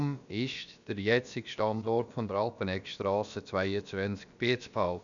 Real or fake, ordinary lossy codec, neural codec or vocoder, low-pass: fake; none; codec, 16 kHz, about 1 kbps, DyCAST, with the encoder's durations; 7.2 kHz